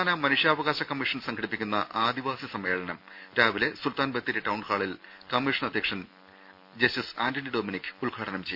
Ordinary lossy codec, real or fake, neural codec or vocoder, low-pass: none; real; none; 5.4 kHz